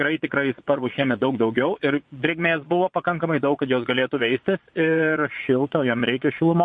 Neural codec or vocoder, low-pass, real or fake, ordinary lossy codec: vocoder, 22.05 kHz, 80 mel bands, Vocos; 9.9 kHz; fake; MP3, 48 kbps